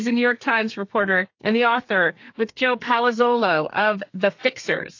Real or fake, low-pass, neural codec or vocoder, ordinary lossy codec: fake; 7.2 kHz; codec, 32 kHz, 1.9 kbps, SNAC; AAC, 48 kbps